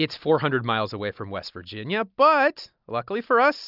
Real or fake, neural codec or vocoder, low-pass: real; none; 5.4 kHz